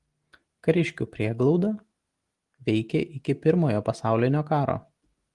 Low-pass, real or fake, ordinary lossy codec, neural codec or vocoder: 10.8 kHz; real; Opus, 24 kbps; none